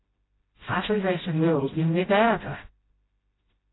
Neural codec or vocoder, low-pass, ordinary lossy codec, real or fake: codec, 16 kHz, 0.5 kbps, FreqCodec, smaller model; 7.2 kHz; AAC, 16 kbps; fake